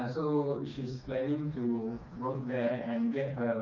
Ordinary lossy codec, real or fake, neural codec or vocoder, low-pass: none; fake; codec, 16 kHz, 2 kbps, FreqCodec, smaller model; 7.2 kHz